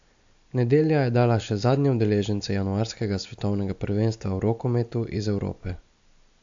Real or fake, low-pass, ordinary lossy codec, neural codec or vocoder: real; 7.2 kHz; AAC, 64 kbps; none